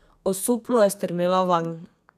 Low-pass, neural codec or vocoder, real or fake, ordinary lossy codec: 14.4 kHz; codec, 32 kHz, 1.9 kbps, SNAC; fake; none